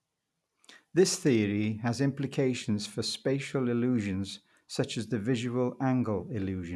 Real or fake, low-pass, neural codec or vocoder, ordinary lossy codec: real; none; none; none